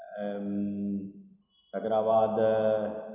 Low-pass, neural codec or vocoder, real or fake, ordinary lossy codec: 3.6 kHz; none; real; none